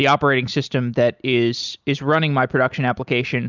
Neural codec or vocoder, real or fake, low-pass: none; real; 7.2 kHz